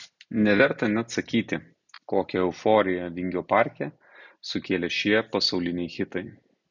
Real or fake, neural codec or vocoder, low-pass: real; none; 7.2 kHz